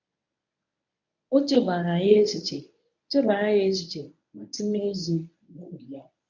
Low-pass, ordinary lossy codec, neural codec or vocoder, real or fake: 7.2 kHz; none; codec, 24 kHz, 0.9 kbps, WavTokenizer, medium speech release version 1; fake